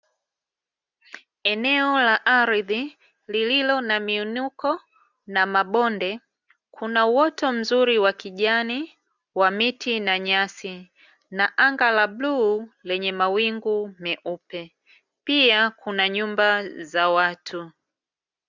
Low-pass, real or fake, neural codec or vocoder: 7.2 kHz; real; none